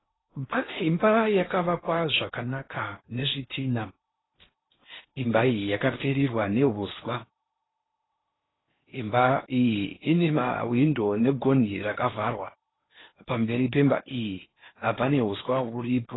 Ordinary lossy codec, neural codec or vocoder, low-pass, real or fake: AAC, 16 kbps; codec, 16 kHz in and 24 kHz out, 0.8 kbps, FocalCodec, streaming, 65536 codes; 7.2 kHz; fake